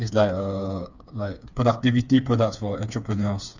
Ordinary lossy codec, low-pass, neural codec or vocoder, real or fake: none; 7.2 kHz; codec, 16 kHz, 4 kbps, FreqCodec, smaller model; fake